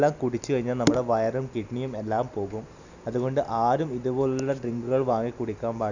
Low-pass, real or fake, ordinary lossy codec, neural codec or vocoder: 7.2 kHz; real; none; none